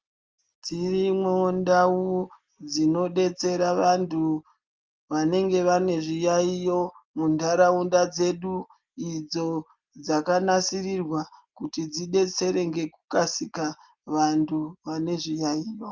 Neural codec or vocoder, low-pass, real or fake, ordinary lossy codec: none; 7.2 kHz; real; Opus, 24 kbps